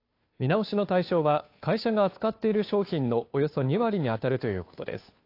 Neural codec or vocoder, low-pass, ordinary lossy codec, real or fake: codec, 16 kHz, 2 kbps, FunCodec, trained on Chinese and English, 25 frames a second; 5.4 kHz; AAC, 32 kbps; fake